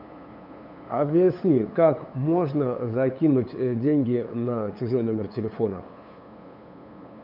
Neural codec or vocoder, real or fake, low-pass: codec, 16 kHz, 8 kbps, FunCodec, trained on LibriTTS, 25 frames a second; fake; 5.4 kHz